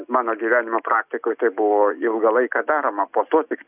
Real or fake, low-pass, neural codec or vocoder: real; 3.6 kHz; none